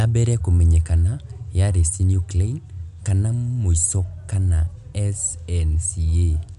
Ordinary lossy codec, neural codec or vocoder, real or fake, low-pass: none; none; real; 10.8 kHz